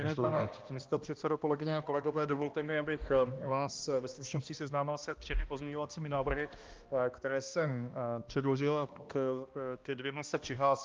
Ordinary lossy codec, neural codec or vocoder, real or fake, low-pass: Opus, 24 kbps; codec, 16 kHz, 1 kbps, X-Codec, HuBERT features, trained on balanced general audio; fake; 7.2 kHz